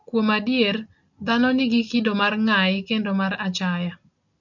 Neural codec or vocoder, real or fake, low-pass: none; real; 7.2 kHz